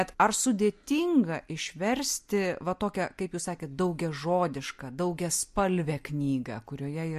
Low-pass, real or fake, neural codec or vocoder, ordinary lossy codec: 14.4 kHz; real; none; MP3, 64 kbps